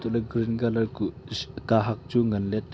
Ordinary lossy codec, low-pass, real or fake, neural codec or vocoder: none; none; real; none